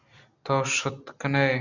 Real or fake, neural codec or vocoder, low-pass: real; none; 7.2 kHz